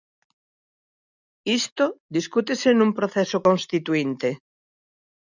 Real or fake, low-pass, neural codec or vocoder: real; 7.2 kHz; none